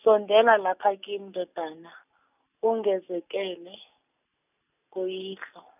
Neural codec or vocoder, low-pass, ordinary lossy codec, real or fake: none; 3.6 kHz; none; real